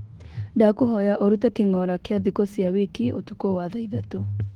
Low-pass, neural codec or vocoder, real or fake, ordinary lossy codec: 19.8 kHz; autoencoder, 48 kHz, 32 numbers a frame, DAC-VAE, trained on Japanese speech; fake; Opus, 16 kbps